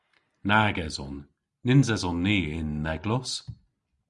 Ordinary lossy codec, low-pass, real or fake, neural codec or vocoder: Opus, 64 kbps; 10.8 kHz; real; none